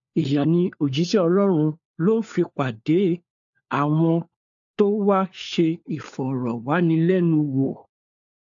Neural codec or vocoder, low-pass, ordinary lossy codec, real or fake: codec, 16 kHz, 4 kbps, FunCodec, trained on LibriTTS, 50 frames a second; 7.2 kHz; AAC, 64 kbps; fake